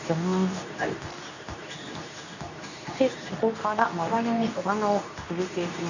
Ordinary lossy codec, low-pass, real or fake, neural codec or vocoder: none; 7.2 kHz; fake; codec, 24 kHz, 0.9 kbps, WavTokenizer, medium speech release version 2